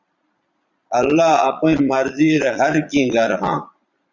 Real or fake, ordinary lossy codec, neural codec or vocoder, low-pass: fake; Opus, 64 kbps; vocoder, 22.05 kHz, 80 mel bands, Vocos; 7.2 kHz